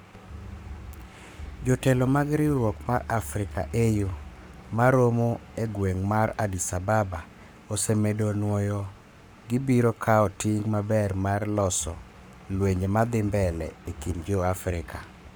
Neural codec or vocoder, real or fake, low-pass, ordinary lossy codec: codec, 44.1 kHz, 7.8 kbps, Pupu-Codec; fake; none; none